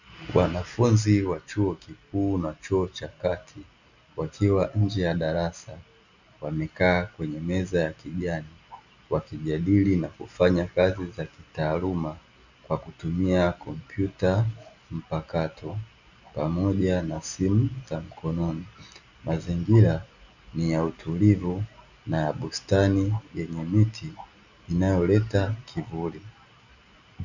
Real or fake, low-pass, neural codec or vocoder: real; 7.2 kHz; none